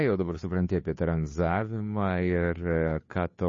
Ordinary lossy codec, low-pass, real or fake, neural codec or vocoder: MP3, 32 kbps; 7.2 kHz; fake; codec, 16 kHz, 2 kbps, FunCodec, trained on Chinese and English, 25 frames a second